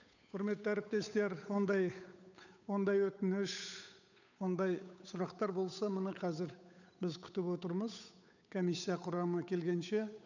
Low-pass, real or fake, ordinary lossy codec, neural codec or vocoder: 7.2 kHz; fake; none; codec, 16 kHz, 8 kbps, FunCodec, trained on Chinese and English, 25 frames a second